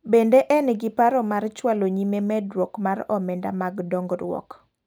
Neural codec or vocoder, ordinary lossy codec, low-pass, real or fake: none; none; none; real